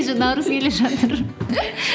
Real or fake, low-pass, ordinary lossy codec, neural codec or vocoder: real; none; none; none